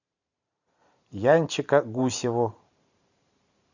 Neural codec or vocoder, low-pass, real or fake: vocoder, 44.1 kHz, 128 mel bands every 512 samples, BigVGAN v2; 7.2 kHz; fake